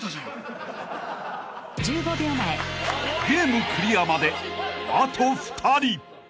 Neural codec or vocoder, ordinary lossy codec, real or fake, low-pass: none; none; real; none